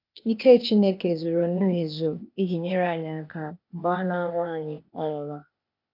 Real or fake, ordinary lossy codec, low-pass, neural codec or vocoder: fake; none; 5.4 kHz; codec, 16 kHz, 0.8 kbps, ZipCodec